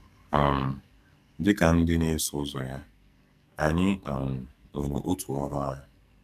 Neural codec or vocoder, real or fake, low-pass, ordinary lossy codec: codec, 44.1 kHz, 2.6 kbps, SNAC; fake; 14.4 kHz; none